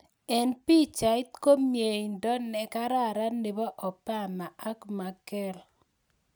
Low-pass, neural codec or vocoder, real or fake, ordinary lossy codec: none; none; real; none